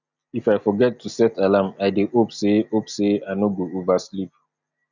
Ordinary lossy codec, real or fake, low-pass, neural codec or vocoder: none; real; 7.2 kHz; none